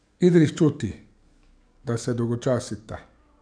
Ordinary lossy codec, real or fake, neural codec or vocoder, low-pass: none; fake; codec, 44.1 kHz, 7.8 kbps, DAC; 9.9 kHz